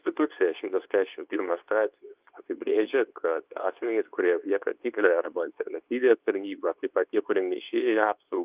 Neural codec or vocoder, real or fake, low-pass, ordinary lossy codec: codec, 24 kHz, 0.9 kbps, WavTokenizer, medium speech release version 2; fake; 3.6 kHz; Opus, 24 kbps